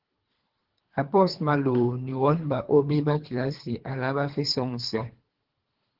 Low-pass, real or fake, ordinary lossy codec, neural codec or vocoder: 5.4 kHz; fake; Opus, 16 kbps; codec, 24 kHz, 3 kbps, HILCodec